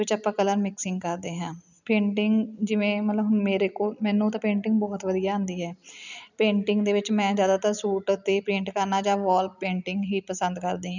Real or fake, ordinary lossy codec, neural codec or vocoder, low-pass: real; none; none; 7.2 kHz